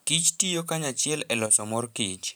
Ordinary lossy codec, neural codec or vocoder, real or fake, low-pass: none; vocoder, 44.1 kHz, 128 mel bands every 512 samples, BigVGAN v2; fake; none